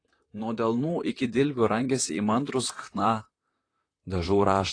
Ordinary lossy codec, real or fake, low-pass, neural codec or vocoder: AAC, 32 kbps; fake; 9.9 kHz; vocoder, 44.1 kHz, 128 mel bands, Pupu-Vocoder